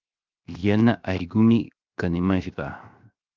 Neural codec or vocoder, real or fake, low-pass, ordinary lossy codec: codec, 16 kHz, 0.7 kbps, FocalCodec; fake; 7.2 kHz; Opus, 24 kbps